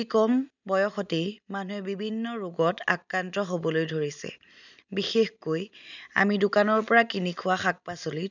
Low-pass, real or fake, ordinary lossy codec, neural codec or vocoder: 7.2 kHz; real; none; none